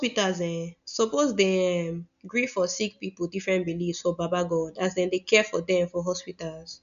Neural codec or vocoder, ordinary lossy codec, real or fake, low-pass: none; none; real; 7.2 kHz